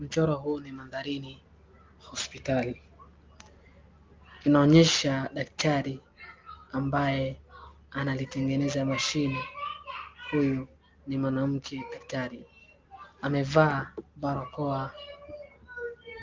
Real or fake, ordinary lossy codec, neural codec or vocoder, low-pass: real; Opus, 32 kbps; none; 7.2 kHz